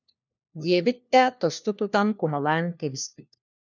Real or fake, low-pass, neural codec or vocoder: fake; 7.2 kHz; codec, 16 kHz, 1 kbps, FunCodec, trained on LibriTTS, 50 frames a second